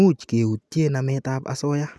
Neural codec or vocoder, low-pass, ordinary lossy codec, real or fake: none; none; none; real